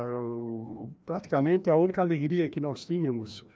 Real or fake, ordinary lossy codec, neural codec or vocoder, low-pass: fake; none; codec, 16 kHz, 1 kbps, FreqCodec, larger model; none